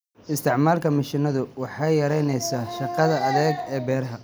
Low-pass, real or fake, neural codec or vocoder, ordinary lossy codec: none; real; none; none